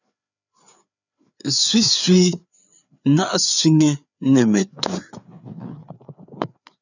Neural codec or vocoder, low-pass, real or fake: codec, 16 kHz, 4 kbps, FreqCodec, larger model; 7.2 kHz; fake